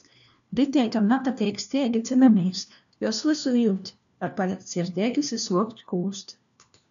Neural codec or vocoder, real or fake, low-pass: codec, 16 kHz, 1 kbps, FunCodec, trained on LibriTTS, 50 frames a second; fake; 7.2 kHz